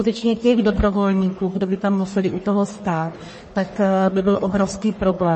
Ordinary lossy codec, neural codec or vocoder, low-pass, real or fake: MP3, 32 kbps; codec, 44.1 kHz, 1.7 kbps, Pupu-Codec; 10.8 kHz; fake